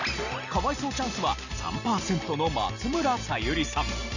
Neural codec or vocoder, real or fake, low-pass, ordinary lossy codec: none; real; 7.2 kHz; none